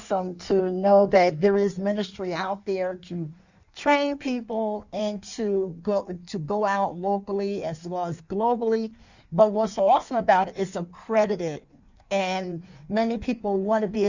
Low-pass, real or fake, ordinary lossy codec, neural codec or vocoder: 7.2 kHz; fake; Opus, 64 kbps; codec, 16 kHz in and 24 kHz out, 1.1 kbps, FireRedTTS-2 codec